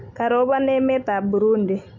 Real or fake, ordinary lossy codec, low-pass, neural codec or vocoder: real; MP3, 48 kbps; 7.2 kHz; none